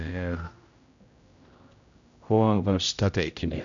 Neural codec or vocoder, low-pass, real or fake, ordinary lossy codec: codec, 16 kHz, 0.5 kbps, X-Codec, HuBERT features, trained on general audio; 7.2 kHz; fake; none